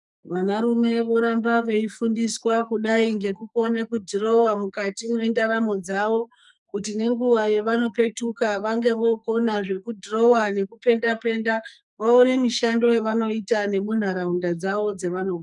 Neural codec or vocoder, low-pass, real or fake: codec, 44.1 kHz, 2.6 kbps, SNAC; 10.8 kHz; fake